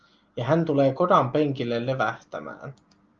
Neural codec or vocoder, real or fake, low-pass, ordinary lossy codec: none; real; 7.2 kHz; Opus, 32 kbps